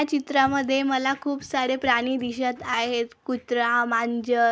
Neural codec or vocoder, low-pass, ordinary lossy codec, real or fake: none; none; none; real